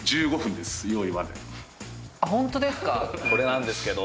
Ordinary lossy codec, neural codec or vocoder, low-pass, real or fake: none; none; none; real